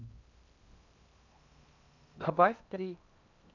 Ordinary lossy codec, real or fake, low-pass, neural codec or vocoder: none; fake; 7.2 kHz; codec, 16 kHz in and 24 kHz out, 0.8 kbps, FocalCodec, streaming, 65536 codes